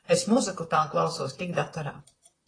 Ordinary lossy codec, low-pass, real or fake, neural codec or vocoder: AAC, 32 kbps; 9.9 kHz; fake; vocoder, 44.1 kHz, 128 mel bands, Pupu-Vocoder